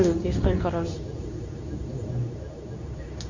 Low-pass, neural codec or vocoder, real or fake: 7.2 kHz; codec, 24 kHz, 0.9 kbps, WavTokenizer, medium speech release version 2; fake